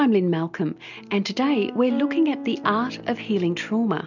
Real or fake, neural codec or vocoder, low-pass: real; none; 7.2 kHz